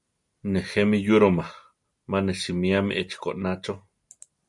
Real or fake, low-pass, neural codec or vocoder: real; 10.8 kHz; none